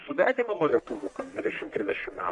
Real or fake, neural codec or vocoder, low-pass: fake; codec, 44.1 kHz, 1.7 kbps, Pupu-Codec; 10.8 kHz